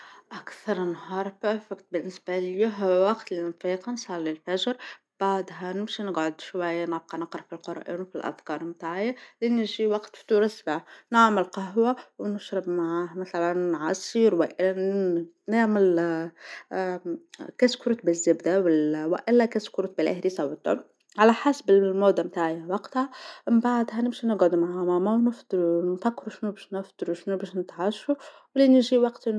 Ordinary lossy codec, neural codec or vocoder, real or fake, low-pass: none; none; real; none